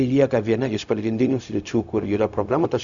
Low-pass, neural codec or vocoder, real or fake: 7.2 kHz; codec, 16 kHz, 0.4 kbps, LongCat-Audio-Codec; fake